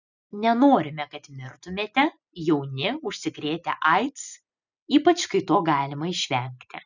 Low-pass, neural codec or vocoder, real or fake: 7.2 kHz; none; real